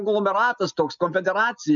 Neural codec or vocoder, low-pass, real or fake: none; 7.2 kHz; real